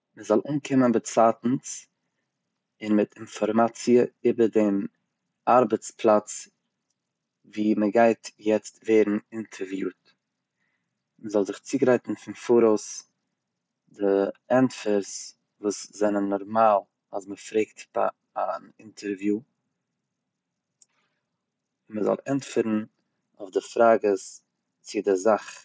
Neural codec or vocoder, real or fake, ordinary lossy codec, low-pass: none; real; none; none